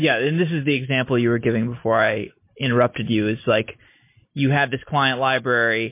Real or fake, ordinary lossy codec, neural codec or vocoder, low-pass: real; MP3, 24 kbps; none; 3.6 kHz